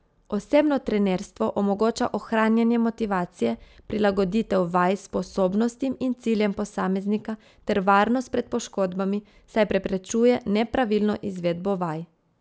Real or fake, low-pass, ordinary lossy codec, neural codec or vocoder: real; none; none; none